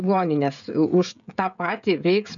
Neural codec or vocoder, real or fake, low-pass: codec, 16 kHz, 16 kbps, FreqCodec, smaller model; fake; 7.2 kHz